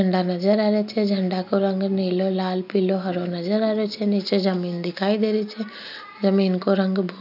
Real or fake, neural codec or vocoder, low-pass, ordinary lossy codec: real; none; 5.4 kHz; none